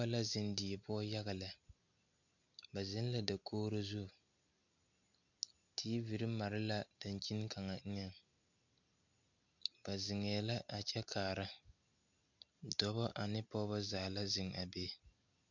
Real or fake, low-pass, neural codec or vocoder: real; 7.2 kHz; none